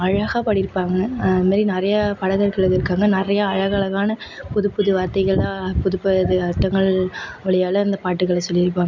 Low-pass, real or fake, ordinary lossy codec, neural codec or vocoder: 7.2 kHz; real; none; none